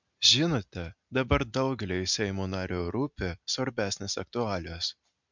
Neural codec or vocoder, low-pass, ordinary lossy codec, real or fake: none; 7.2 kHz; MP3, 64 kbps; real